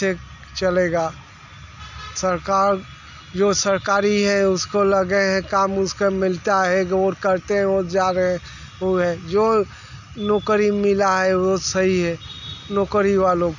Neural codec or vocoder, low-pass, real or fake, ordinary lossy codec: none; 7.2 kHz; real; none